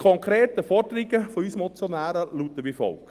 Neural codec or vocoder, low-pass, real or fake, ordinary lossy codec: none; 14.4 kHz; real; Opus, 24 kbps